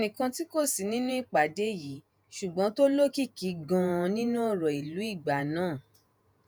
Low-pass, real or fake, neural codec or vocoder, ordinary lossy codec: none; fake; vocoder, 48 kHz, 128 mel bands, Vocos; none